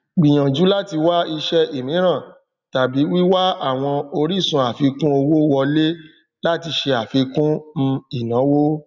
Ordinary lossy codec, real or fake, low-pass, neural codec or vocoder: none; real; 7.2 kHz; none